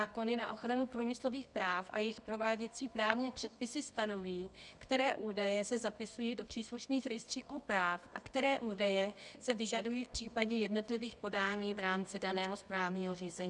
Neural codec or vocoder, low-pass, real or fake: codec, 24 kHz, 0.9 kbps, WavTokenizer, medium music audio release; 10.8 kHz; fake